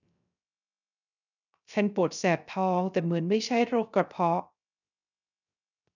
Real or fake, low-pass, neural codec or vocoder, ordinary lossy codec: fake; 7.2 kHz; codec, 16 kHz, 0.3 kbps, FocalCodec; none